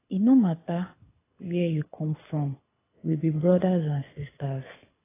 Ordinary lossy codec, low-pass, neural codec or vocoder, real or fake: AAC, 16 kbps; 3.6 kHz; codec, 24 kHz, 6 kbps, HILCodec; fake